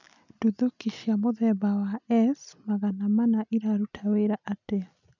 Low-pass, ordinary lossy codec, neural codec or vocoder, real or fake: 7.2 kHz; none; none; real